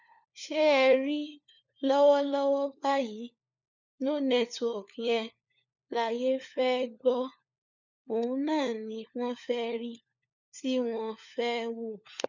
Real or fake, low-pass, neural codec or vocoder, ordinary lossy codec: fake; 7.2 kHz; codec, 16 kHz, 4 kbps, FunCodec, trained on LibriTTS, 50 frames a second; none